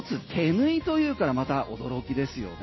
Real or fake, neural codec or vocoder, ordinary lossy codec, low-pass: real; none; MP3, 24 kbps; 7.2 kHz